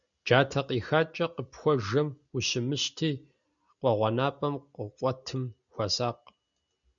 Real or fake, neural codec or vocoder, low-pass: real; none; 7.2 kHz